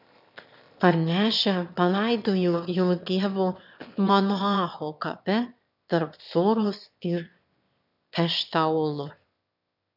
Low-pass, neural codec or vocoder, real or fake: 5.4 kHz; autoencoder, 22.05 kHz, a latent of 192 numbers a frame, VITS, trained on one speaker; fake